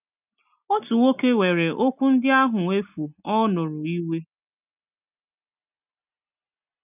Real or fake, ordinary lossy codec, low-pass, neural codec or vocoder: real; none; 3.6 kHz; none